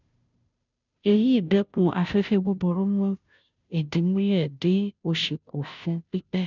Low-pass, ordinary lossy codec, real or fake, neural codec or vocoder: 7.2 kHz; none; fake; codec, 16 kHz, 0.5 kbps, FunCodec, trained on Chinese and English, 25 frames a second